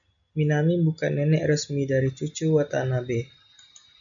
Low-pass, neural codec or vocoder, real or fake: 7.2 kHz; none; real